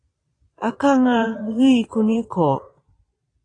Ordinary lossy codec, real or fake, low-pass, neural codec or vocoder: AAC, 32 kbps; fake; 9.9 kHz; vocoder, 22.05 kHz, 80 mel bands, Vocos